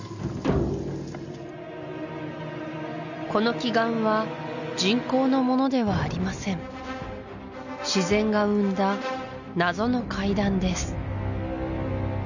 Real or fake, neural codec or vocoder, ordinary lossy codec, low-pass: real; none; none; 7.2 kHz